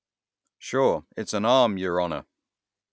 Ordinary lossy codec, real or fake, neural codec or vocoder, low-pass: none; real; none; none